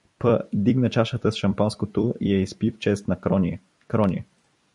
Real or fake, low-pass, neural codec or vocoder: fake; 10.8 kHz; vocoder, 44.1 kHz, 128 mel bands every 256 samples, BigVGAN v2